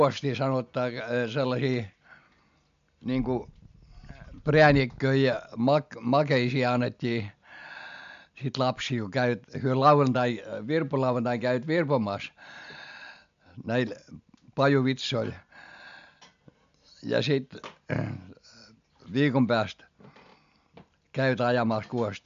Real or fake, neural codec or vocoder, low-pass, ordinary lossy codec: real; none; 7.2 kHz; MP3, 64 kbps